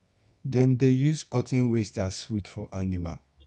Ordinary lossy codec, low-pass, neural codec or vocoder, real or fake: none; 10.8 kHz; codec, 24 kHz, 0.9 kbps, WavTokenizer, medium music audio release; fake